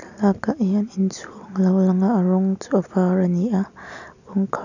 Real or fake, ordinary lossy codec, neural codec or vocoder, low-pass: real; none; none; 7.2 kHz